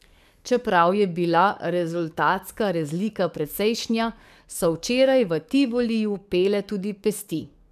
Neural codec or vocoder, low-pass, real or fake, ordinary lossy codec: codec, 44.1 kHz, 7.8 kbps, DAC; 14.4 kHz; fake; none